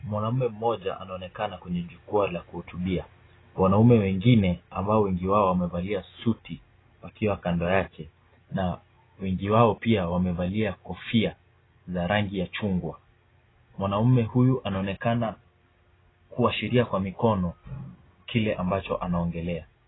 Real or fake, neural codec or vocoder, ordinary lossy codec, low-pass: real; none; AAC, 16 kbps; 7.2 kHz